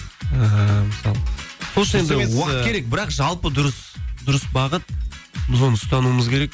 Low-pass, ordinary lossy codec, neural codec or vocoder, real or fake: none; none; none; real